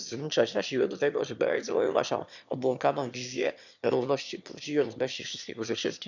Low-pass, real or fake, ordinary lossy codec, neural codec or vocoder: 7.2 kHz; fake; none; autoencoder, 22.05 kHz, a latent of 192 numbers a frame, VITS, trained on one speaker